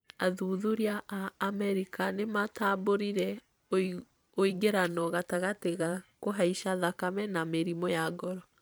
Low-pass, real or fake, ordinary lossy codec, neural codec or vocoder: none; fake; none; vocoder, 44.1 kHz, 128 mel bands every 512 samples, BigVGAN v2